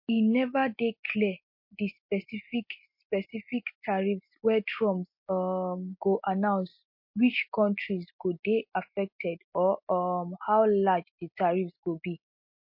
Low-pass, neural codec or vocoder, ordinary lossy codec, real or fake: 5.4 kHz; none; MP3, 32 kbps; real